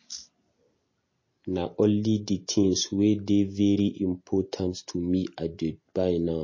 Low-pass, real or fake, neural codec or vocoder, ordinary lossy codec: 7.2 kHz; real; none; MP3, 32 kbps